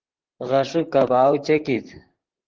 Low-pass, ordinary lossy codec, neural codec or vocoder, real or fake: 7.2 kHz; Opus, 16 kbps; vocoder, 44.1 kHz, 128 mel bands, Pupu-Vocoder; fake